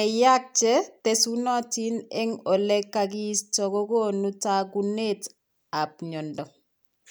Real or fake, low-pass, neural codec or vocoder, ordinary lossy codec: real; none; none; none